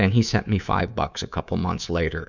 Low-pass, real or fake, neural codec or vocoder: 7.2 kHz; fake; codec, 24 kHz, 3.1 kbps, DualCodec